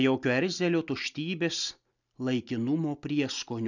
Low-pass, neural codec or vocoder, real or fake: 7.2 kHz; none; real